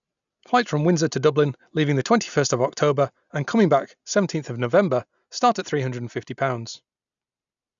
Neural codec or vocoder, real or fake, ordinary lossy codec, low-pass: none; real; none; 7.2 kHz